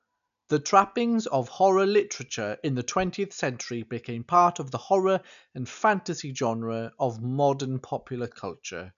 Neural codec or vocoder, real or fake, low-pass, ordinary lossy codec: none; real; 7.2 kHz; none